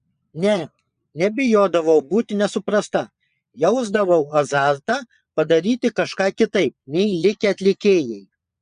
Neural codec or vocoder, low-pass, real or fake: vocoder, 22.05 kHz, 80 mel bands, Vocos; 9.9 kHz; fake